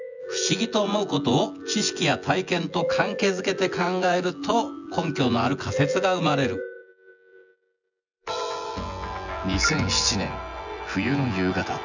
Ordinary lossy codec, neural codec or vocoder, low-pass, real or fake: none; vocoder, 24 kHz, 100 mel bands, Vocos; 7.2 kHz; fake